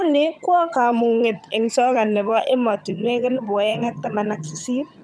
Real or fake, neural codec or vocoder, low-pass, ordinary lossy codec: fake; vocoder, 22.05 kHz, 80 mel bands, HiFi-GAN; none; none